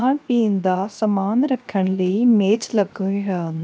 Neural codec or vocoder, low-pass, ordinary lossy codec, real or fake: codec, 16 kHz, 0.7 kbps, FocalCodec; none; none; fake